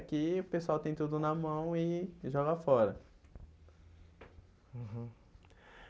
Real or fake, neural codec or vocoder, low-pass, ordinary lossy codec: real; none; none; none